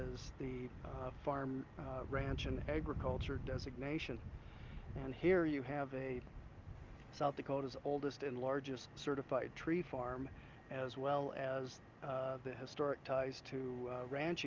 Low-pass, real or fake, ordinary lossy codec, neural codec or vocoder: 7.2 kHz; real; Opus, 32 kbps; none